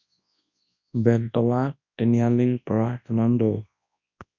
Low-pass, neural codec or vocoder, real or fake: 7.2 kHz; codec, 24 kHz, 0.9 kbps, WavTokenizer, large speech release; fake